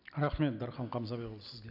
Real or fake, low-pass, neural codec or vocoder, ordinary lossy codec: real; 5.4 kHz; none; none